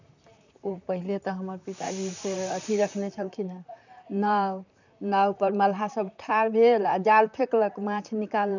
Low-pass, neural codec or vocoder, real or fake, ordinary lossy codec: 7.2 kHz; vocoder, 44.1 kHz, 128 mel bands, Pupu-Vocoder; fake; none